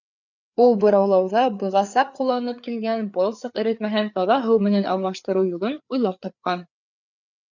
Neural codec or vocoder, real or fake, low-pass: codec, 16 kHz, 4 kbps, FreqCodec, larger model; fake; 7.2 kHz